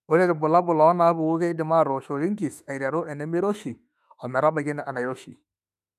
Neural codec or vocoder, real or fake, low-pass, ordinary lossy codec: autoencoder, 48 kHz, 32 numbers a frame, DAC-VAE, trained on Japanese speech; fake; 14.4 kHz; none